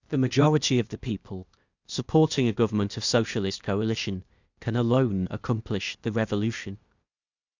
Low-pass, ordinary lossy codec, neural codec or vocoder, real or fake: 7.2 kHz; Opus, 64 kbps; codec, 16 kHz, 0.8 kbps, ZipCodec; fake